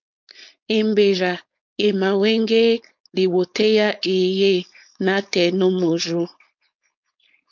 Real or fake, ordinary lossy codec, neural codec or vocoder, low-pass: fake; MP3, 48 kbps; codec, 16 kHz, 4.8 kbps, FACodec; 7.2 kHz